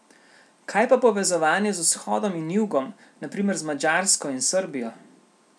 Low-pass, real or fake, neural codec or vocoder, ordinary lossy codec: none; real; none; none